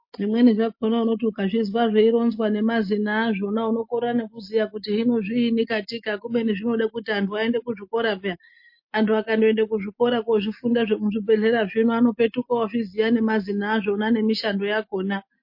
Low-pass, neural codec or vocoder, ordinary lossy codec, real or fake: 5.4 kHz; none; MP3, 32 kbps; real